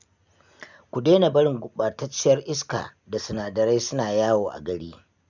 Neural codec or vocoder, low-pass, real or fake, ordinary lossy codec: none; 7.2 kHz; real; none